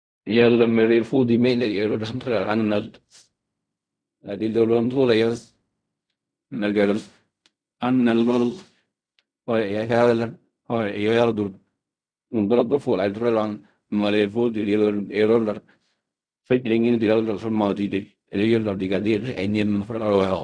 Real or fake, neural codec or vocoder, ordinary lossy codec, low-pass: fake; codec, 16 kHz in and 24 kHz out, 0.4 kbps, LongCat-Audio-Codec, fine tuned four codebook decoder; none; 9.9 kHz